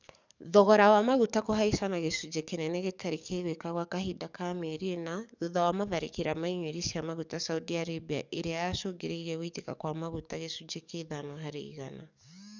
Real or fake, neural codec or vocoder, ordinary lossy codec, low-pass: fake; codec, 44.1 kHz, 7.8 kbps, DAC; none; 7.2 kHz